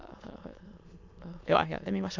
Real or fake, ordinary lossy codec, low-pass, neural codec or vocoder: fake; MP3, 64 kbps; 7.2 kHz; autoencoder, 22.05 kHz, a latent of 192 numbers a frame, VITS, trained on many speakers